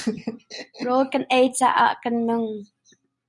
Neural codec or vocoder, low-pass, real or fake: none; 10.8 kHz; real